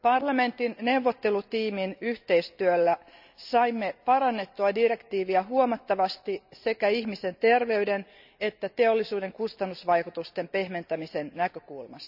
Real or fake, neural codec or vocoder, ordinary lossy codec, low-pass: real; none; none; 5.4 kHz